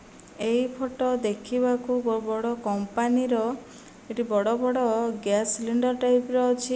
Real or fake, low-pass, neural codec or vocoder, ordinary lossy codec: real; none; none; none